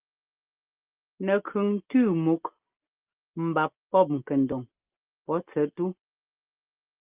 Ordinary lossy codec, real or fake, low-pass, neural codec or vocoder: Opus, 24 kbps; real; 3.6 kHz; none